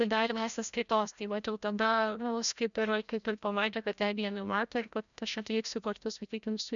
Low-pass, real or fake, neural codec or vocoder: 7.2 kHz; fake; codec, 16 kHz, 0.5 kbps, FreqCodec, larger model